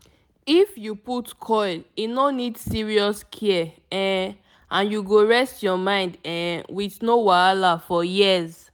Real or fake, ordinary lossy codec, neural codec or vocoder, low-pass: real; none; none; none